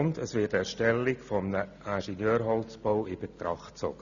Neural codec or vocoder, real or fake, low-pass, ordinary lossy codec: none; real; 7.2 kHz; none